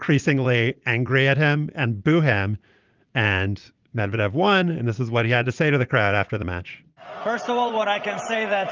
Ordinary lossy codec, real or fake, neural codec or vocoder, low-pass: Opus, 24 kbps; fake; vocoder, 44.1 kHz, 80 mel bands, Vocos; 7.2 kHz